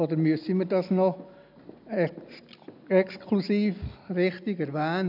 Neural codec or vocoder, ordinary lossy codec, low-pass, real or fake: none; none; 5.4 kHz; real